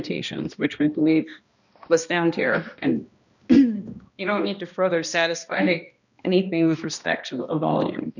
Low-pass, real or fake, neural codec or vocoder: 7.2 kHz; fake; codec, 16 kHz, 1 kbps, X-Codec, HuBERT features, trained on balanced general audio